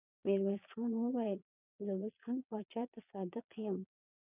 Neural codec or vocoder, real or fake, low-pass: vocoder, 22.05 kHz, 80 mel bands, Vocos; fake; 3.6 kHz